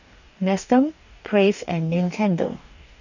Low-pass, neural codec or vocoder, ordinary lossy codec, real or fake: 7.2 kHz; codec, 44.1 kHz, 2.6 kbps, DAC; none; fake